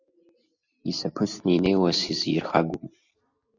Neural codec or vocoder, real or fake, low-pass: none; real; 7.2 kHz